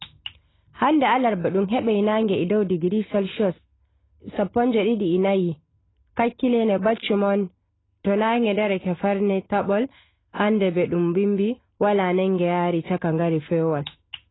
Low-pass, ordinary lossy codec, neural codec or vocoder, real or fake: 7.2 kHz; AAC, 16 kbps; none; real